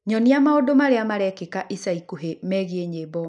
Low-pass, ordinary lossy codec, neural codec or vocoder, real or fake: 10.8 kHz; none; none; real